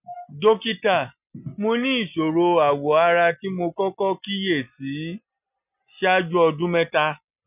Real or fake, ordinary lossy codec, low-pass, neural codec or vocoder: real; none; 3.6 kHz; none